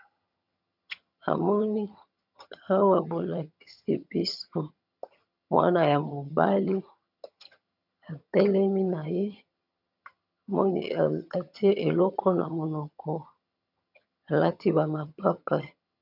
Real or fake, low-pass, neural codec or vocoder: fake; 5.4 kHz; vocoder, 22.05 kHz, 80 mel bands, HiFi-GAN